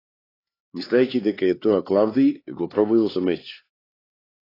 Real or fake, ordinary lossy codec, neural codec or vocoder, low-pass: fake; AAC, 24 kbps; codec, 16 kHz, 4 kbps, X-Codec, HuBERT features, trained on LibriSpeech; 5.4 kHz